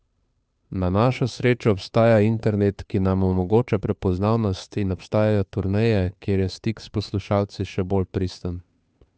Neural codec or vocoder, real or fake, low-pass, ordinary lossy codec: codec, 16 kHz, 2 kbps, FunCodec, trained on Chinese and English, 25 frames a second; fake; none; none